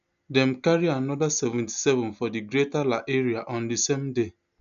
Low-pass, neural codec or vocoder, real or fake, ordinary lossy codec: 7.2 kHz; none; real; none